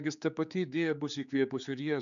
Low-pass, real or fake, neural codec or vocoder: 7.2 kHz; fake; codec, 16 kHz, 4 kbps, X-Codec, HuBERT features, trained on general audio